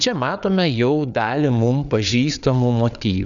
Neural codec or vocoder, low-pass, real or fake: codec, 16 kHz, 4 kbps, FreqCodec, larger model; 7.2 kHz; fake